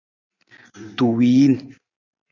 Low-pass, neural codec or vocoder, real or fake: 7.2 kHz; none; real